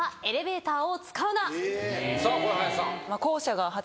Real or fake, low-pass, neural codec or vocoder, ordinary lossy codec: real; none; none; none